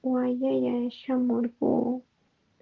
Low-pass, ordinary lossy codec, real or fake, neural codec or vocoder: 7.2 kHz; Opus, 16 kbps; real; none